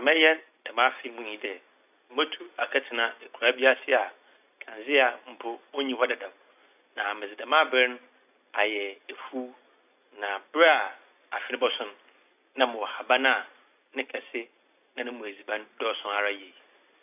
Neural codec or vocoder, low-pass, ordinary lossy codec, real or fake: none; 3.6 kHz; none; real